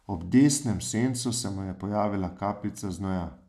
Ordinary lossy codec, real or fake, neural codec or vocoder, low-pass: none; real; none; 14.4 kHz